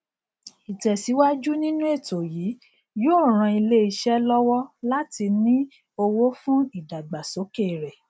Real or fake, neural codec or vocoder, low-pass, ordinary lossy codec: real; none; none; none